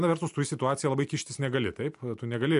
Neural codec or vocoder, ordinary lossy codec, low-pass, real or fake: none; MP3, 64 kbps; 10.8 kHz; real